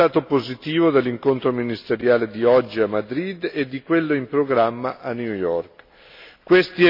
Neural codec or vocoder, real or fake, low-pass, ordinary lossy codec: none; real; 5.4 kHz; MP3, 32 kbps